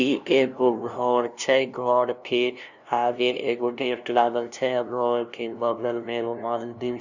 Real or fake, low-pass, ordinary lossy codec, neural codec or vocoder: fake; 7.2 kHz; none; codec, 16 kHz, 0.5 kbps, FunCodec, trained on LibriTTS, 25 frames a second